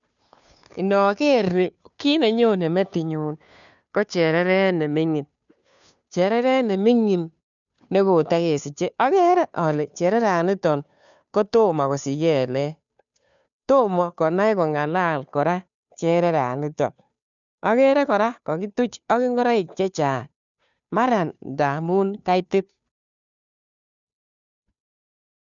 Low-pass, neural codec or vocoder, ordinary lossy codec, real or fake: 7.2 kHz; codec, 16 kHz, 2 kbps, FunCodec, trained on Chinese and English, 25 frames a second; none; fake